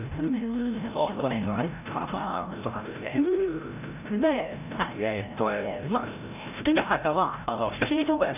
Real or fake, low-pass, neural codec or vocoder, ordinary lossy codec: fake; 3.6 kHz; codec, 16 kHz, 0.5 kbps, FreqCodec, larger model; none